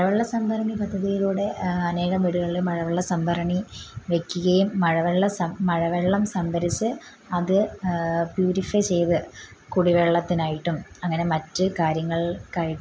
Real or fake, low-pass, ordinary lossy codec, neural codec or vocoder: real; none; none; none